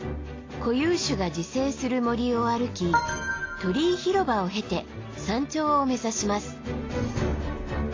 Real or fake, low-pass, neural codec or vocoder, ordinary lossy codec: real; 7.2 kHz; none; AAC, 32 kbps